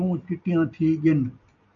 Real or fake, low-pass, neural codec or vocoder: real; 7.2 kHz; none